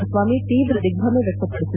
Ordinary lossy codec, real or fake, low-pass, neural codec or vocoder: none; real; 3.6 kHz; none